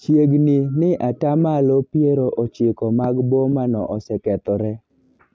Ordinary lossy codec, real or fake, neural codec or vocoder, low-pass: none; real; none; none